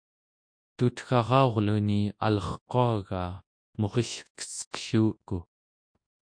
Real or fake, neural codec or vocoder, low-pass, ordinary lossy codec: fake; codec, 24 kHz, 0.9 kbps, WavTokenizer, large speech release; 9.9 kHz; MP3, 48 kbps